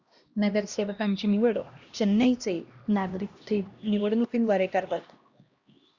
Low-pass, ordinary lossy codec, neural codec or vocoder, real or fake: 7.2 kHz; Opus, 64 kbps; codec, 16 kHz, 1 kbps, X-Codec, HuBERT features, trained on LibriSpeech; fake